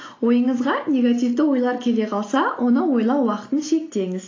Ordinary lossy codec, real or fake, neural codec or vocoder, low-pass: AAC, 32 kbps; real; none; 7.2 kHz